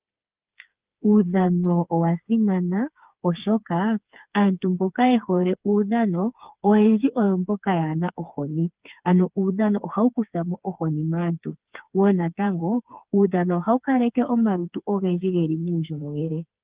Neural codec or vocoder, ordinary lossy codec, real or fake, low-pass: codec, 16 kHz, 4 kbps, FreqCodec, smaller model; Opus, 32 kbps; fake; 3.6 kHz